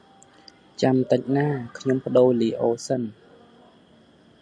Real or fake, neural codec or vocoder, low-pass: real; none; 9.9 kHz